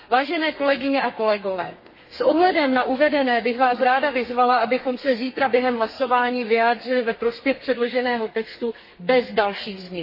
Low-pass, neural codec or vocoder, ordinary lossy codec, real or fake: 5.4 kHz; codec, 32 kHz, 1.9 kbps, SNAC; MP3, 24 kbps; fake